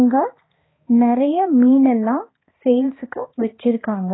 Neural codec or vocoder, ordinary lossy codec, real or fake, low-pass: codec, 16 kHz, 2 kbps, X-Codec, HuBERT features, trained on balanced general audio; AAC, 16 kbps; fake; 7.2 kHz